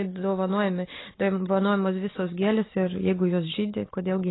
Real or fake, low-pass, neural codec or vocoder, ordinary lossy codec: real; 7.2 kHz; none; AAC, 16 kbps